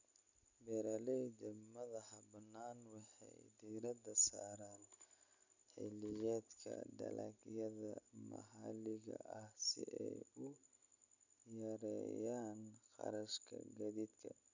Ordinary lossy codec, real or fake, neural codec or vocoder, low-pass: none; real; none; 7.2 kHz